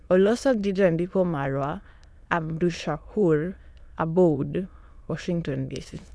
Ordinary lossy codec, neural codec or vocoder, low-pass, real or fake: none; autoencoder, 22.05 kHz, a latent of 192 numbers a frame, VITS, trained on many speakers; none; fake